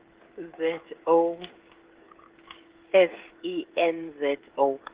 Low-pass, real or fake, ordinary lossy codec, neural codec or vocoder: 3.6 kHz; fake; Opus, 16 kbps; codec, 16 kHz, 16 kbps, FreqCodec, smaller model